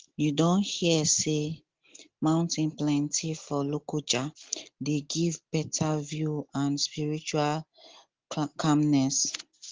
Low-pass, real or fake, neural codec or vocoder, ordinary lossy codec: 7.2 kHz; real; none; Opus, 16 kbps